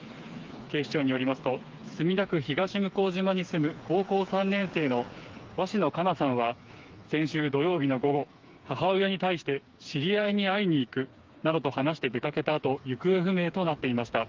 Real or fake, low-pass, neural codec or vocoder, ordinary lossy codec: fake; 7.2 kHz; codec, 16 kHz, 4 kbps, FreqCodec, smaller model; Opus, 24 kbps